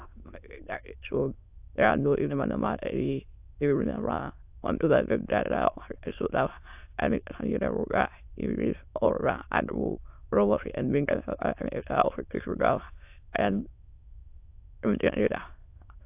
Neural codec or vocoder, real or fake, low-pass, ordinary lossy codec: autoencoder, 22.05 kHz, a latent of 192 numbers a frame, VITS, trained on many speakers; fake; 3.6 kHz; AAC, 32 kbps